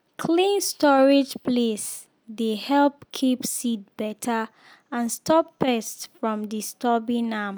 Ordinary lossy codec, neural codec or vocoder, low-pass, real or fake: none; none; none; real